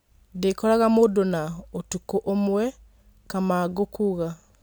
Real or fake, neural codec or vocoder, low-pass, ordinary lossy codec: real; none; none; none